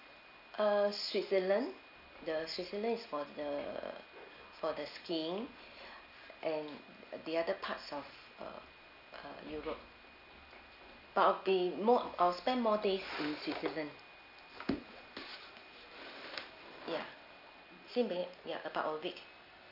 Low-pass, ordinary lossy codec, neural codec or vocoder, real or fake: 5.4 kHz; none; none; real